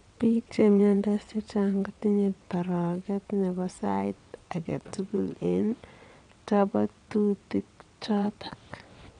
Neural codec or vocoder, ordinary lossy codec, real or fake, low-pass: vocoder, 22.05 kHz, 80 mel bands, WaveNeXt; none; fake; 9.9 kHz